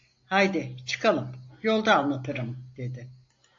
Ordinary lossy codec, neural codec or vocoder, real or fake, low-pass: AAC, 48 kbps; none; real; 7.2 kHz